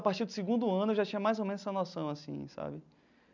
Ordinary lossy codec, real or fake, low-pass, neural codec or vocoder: none; real; 7.2 kHz; none